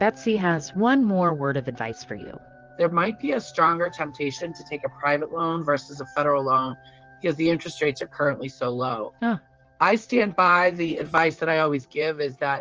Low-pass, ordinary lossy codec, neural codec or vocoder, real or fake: 7.2 kHz; Opus, 24 kbps; vocoder, 44.1 kHz, 128 mel bands, Pupu-Vocoder; fake